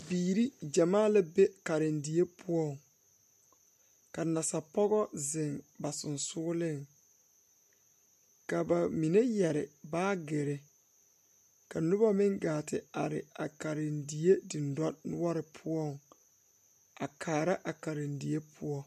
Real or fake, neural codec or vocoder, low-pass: real; none; 14.4 kHz